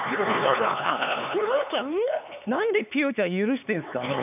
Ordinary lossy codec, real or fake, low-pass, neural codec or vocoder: none; fake; 3.6 kHz; codec, 16 kHz, 4 kbps, X-Codec, HuBERT features, trained on LibriSpeech